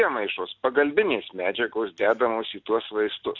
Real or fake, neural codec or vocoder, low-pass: real; none; 7.2 kHz